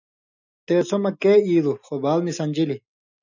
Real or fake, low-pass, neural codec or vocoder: real; 7.2 kHz; none